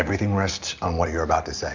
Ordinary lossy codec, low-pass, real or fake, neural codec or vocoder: MP3, 64 kbps; 7.2 kHz; real; none